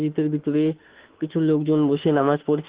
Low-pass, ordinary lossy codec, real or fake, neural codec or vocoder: 3.6 kHz; Opus, 16 kbps; fake; autoencoder, 48 kHz, 32 numbers a frame, DAC-VAE, trained on Japanese speech